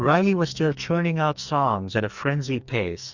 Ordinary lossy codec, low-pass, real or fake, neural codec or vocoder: Opus, 64 kbps; 7.2 kHz; fake; codec, 44.1 kHz, 2.6 kbps, SNAC